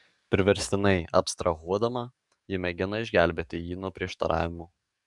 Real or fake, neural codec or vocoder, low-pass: fake; codec, 44.1 kHz, 7.8 kbps, DAC; 10.8 kHz